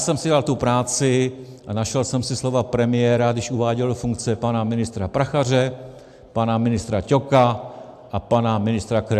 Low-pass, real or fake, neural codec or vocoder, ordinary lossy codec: 14.4 kHz; real; none; AAC, 96 kbps